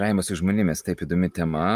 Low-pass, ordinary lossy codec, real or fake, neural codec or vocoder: 14.4 kHz; Opus, 32 kbps; real; none